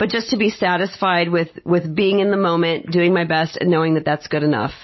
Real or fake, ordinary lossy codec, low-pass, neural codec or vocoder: real; MP3, 24 kbps; 7.2 kHz; none